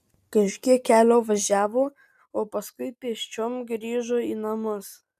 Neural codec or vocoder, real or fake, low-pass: none; real; 14.4 kHz